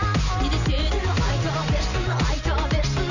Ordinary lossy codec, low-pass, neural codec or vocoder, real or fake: none; 7.2 kHz; none; real